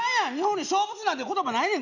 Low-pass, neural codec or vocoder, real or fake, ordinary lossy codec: 7.2 kHz; none; real; none